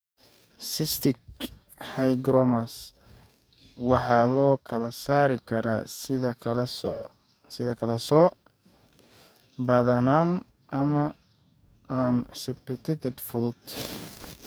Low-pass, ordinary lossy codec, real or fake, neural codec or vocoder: none; none; fake; codec, 44.1 kHz, 2.6 kbps, DAC